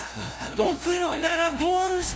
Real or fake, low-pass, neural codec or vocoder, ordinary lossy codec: fake; none; codec, 16 kHz, 0.5 kbps, FunCodec, trained on LibriTTS, 25 frames a second; none